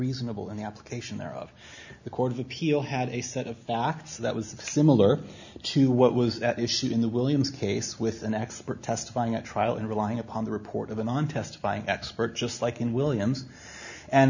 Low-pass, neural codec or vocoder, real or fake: 7.2 kHz; none; real